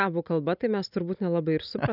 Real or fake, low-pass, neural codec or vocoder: real; 5.4 kHz; none